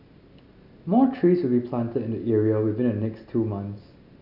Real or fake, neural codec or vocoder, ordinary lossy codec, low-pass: real; none; none; 5.4 kHz